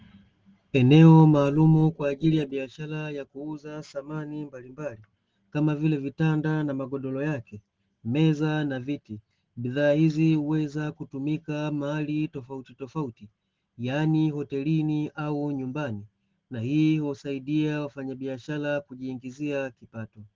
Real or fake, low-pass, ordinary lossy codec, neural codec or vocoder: real; 7.2 kHz; Opus, 16 kbps; none